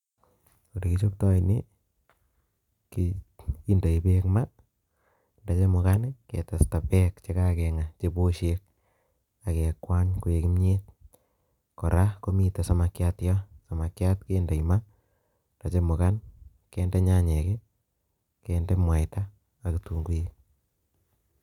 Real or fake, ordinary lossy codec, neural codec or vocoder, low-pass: real; none; none; 19.8 kHz